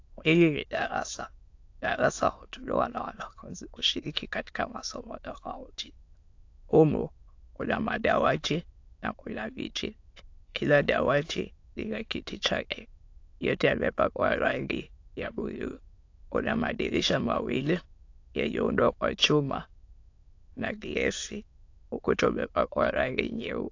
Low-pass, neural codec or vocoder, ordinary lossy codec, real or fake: 7.2 kHz; autoencoder, 22.05 kHz, a latent of 192 numbers a frame, VITS, trained on many speakers; AAC, 48 kbps; fake